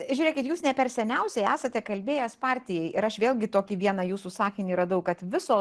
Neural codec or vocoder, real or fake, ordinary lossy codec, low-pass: none; real; Opus, 16 kbps; 10.8 kHz